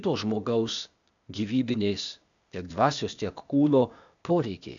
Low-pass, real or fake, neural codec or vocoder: 7.2 kHz; fake; codec, 16 kHz, 0.8 kbps, ZipCodec